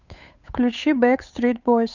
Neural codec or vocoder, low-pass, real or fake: codec, 16 kHz, 8 kbps, FunCodec, trained on Chinese and English, 25 frames a second; 7.2 kHz; fake